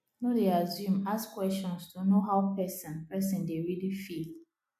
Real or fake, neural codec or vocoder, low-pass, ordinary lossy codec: real; none; 14.4 kHz; MP3, 96 kbps